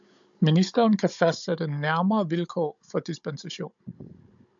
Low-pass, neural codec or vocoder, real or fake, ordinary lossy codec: 7.2 kHz; codec, 16 kHz, 16 kbps, FunCodec, trained on Chinese and English, 50 frames a second; fake; MP3, 64 kbps